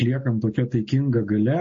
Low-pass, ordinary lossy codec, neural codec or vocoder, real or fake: 7.2 kHz; MP3, 32 kbps; none; real